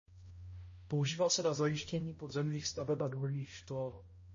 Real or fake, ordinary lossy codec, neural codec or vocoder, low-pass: fake; MP3, 32 kbps; codec, 16 kHz, 0.5 kbps, X-Codec, HuBERT features, trained on balanced general audio; 7.2 kHz